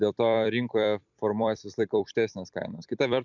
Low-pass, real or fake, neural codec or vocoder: 7.2 kHz; fake; vocoder, 44.1 kHz, 128 mel bands every 256 samples, BigVGAN v2